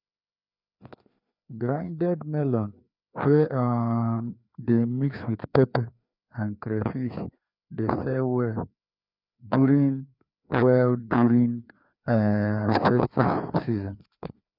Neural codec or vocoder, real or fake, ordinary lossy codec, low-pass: codec, 16 kHz, 2 kbps, FreqCodec, larger model; fake; none; 5.4 kHz